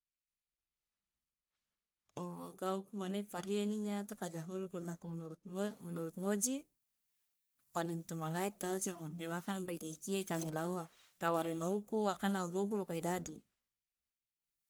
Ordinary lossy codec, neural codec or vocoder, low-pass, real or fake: none; codec, 44.1 kHz, 1.7 kbps, Pupu-Codec; none; fake